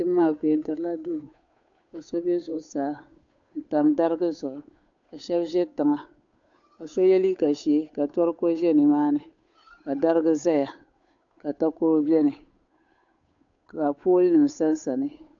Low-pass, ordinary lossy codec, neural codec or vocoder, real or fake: 7.2 kHz; AAC, 64 kbps; codec, 16 kHz, 8 kbps, FunCodec, trained on Chinese and English, 25 frames a second; fake